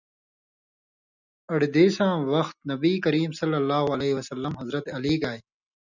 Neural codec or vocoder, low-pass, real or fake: none; 7.2 kHz; real